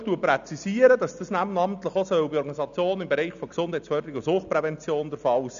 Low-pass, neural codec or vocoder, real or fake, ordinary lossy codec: 7.2 kHz; none; real; none